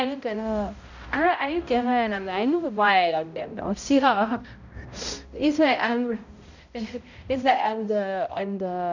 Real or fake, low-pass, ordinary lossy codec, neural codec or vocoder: fake; 7.2 kHz; none; codec, 16 kHz, 0.5 kbps, X-Codec, HuBERT features, trained on balanced general audio